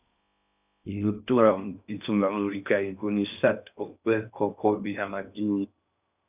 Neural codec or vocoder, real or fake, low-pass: codec, 16 kHz in and 24 kHz out, 0.6 kbps, FocalCodec, streaming, 4096 codes; fake; 3.6 kHz